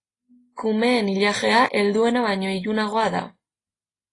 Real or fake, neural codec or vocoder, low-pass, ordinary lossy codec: real; none; 10.8 kHz; AAC, 32 kbps